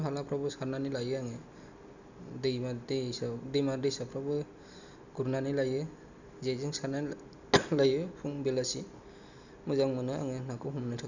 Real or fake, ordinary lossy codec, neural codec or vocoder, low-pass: real; none; none; 7.2 kHz